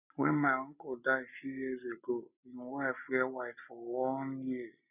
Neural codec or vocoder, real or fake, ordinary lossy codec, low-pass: none; real; none; 3.6 kHz